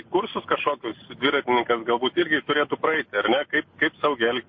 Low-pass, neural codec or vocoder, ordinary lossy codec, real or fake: 7.2 kHz; none; MP3, 32 kbps; real